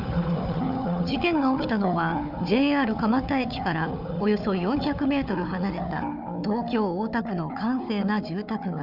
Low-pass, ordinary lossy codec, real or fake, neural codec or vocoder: 5.4 kHz; none; fake; codec, 16 kHz, 4 kbps, FunCodec, trained on Chinese and English, 50 frames a second